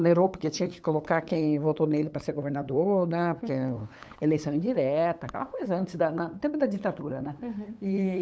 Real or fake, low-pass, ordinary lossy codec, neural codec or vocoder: fake; none; none; codec, 16 kHz, 8 kbps, FunCodec, trained on LibriTTS, 25 frames a second